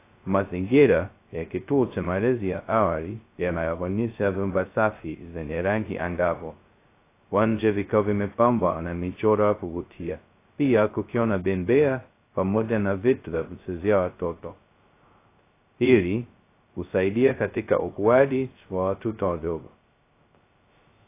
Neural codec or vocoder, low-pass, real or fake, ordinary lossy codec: codec, 16 kHz, 0.2 kbps, FocalCodec; 3.6 kHz; fake; AAC, 24 kbps